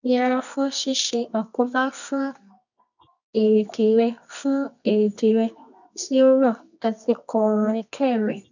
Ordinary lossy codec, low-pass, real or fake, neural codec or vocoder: none; 7.2 kHz; fake; codec, 24 kHz, 0.9 kbps, WavTokenizer, medium music audio release